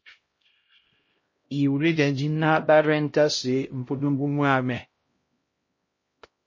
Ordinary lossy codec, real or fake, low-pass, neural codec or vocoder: MP3, 32 kbps; fake; 7.2 kHz; codec, 16 kHz, 0.5 kbps, X-Codec, HuBERT features, trained on LibriSpeech